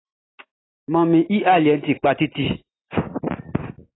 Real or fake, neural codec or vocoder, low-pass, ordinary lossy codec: real; none; 7.2 kHz; AAC, 16 kbps